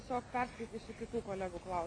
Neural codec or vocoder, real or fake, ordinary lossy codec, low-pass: none; real; AAC, 48 kbps; 9.9 kHz